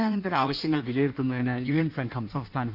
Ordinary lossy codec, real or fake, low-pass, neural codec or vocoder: none; fake; 5.4 kHz; codec, 16 kHz in and 24 kHz out, 1.1 kbps, FireRedTTS-2 codec